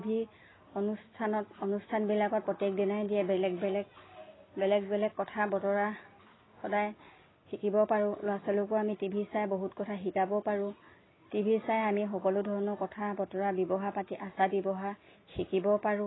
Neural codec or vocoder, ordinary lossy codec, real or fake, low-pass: none; AAC, 16 kbps; real; 7.2 kHz